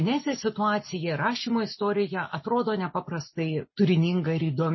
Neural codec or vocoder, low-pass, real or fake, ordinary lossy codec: none; 7.2 kHz; real; MP3, 24 kbps